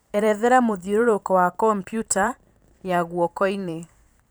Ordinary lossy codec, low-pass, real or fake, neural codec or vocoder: none; none; fake; vocoder, 44.1 kHz, 128 mel bands every 512 samples, BigVGAN v2